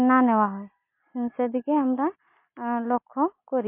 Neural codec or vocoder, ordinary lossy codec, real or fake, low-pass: none; MP3, 24 kbps; real; 3.6 kHz